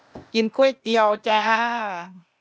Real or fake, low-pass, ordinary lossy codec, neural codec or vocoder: fake; none; none; codec, 16 kHz, 0.8 kbps, ZipCodec